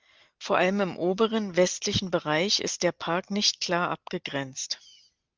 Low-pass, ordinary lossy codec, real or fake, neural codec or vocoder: 7.2 kHz; Opus, 32 kbps; real; none